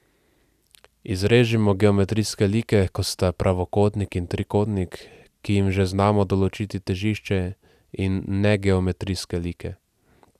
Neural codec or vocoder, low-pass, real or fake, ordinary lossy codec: none; 14.4 kHz; real; none